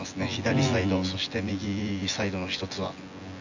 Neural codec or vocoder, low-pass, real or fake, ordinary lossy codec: vocoder, 24 kHz, 100 mel bands, Vocos; 7.2 kHz; fake; AAC, 48 kbps